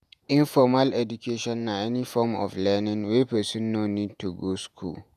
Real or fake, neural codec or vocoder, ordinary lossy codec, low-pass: fake; vocoder, 48 kHz, 128 mel bands, Vocos; none; 14.4 kHz